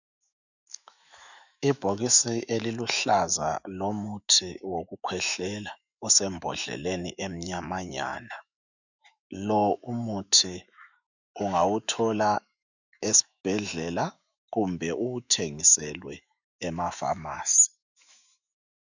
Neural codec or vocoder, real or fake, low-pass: autoencoder, 48 kHz, 128 numbers a frame, DAC-VAE, trained on Japanese speech; fake; 7.2 kHz